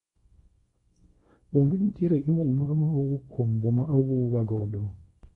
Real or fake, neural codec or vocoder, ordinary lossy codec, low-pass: fake; codec, 24 kHz, 0.9 kbps, WavTokenizer, small release; AAC, 32 kbps; 10.8 kHz